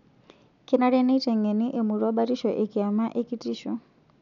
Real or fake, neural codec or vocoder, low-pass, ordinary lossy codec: real; none; 7.2 kHz; none